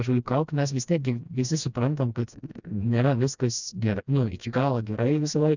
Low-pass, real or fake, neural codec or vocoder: 7.2 kHz; fake; codec, 16 kHz, 1 kbps, FreqCodec, smaller model